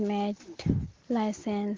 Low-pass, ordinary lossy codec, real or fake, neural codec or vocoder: 7.2 kHz; Opus, 16 kbps; real; none